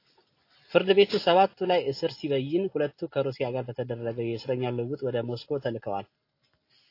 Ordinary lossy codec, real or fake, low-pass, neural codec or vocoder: AAC, 32 kbps; real; 5.4 kHz; none